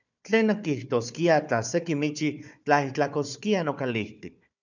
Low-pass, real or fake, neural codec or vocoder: 7.2 kHz; fake; codec, 16 kHz, 4 kbps, FunCodec, trained on Chinese and English, 50 frames a second